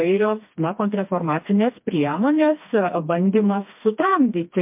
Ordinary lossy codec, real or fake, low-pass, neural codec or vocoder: MP3, 32 kbps; fake; 3.6 kHz; codec, 16 kHz, 2 kbps, FreqCodec, smaller model